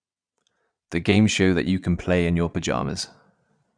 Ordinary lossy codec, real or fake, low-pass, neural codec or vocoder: none; fake; 9.9 kHz; vocoder, 22.05 kHz, 80 mel bands, Vocos